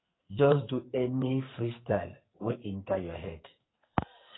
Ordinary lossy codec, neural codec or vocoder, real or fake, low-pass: AAC, 16 kbps; codec, 44.1 kHz, 2.6 kbps, SNAC; fake; 7.2 kHz